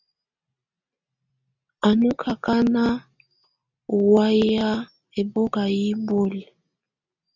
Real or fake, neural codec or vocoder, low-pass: real; none; 7.2 kHz